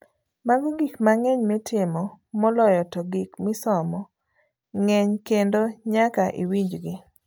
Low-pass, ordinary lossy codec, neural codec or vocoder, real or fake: none; none; none; real